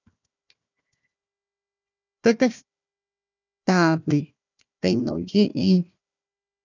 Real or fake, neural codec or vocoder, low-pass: fake; codec, 16 kHz, 1 kbps, FunCodec, trained on Chinese and English, 50 frames a second; 7.2 kHz